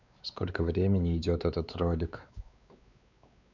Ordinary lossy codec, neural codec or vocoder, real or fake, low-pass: none; codec, 16 kHz, 4 kbps, X-Codec, WavLM features, trained on Multilingual LibriSpeech; fake; 7.2 kHz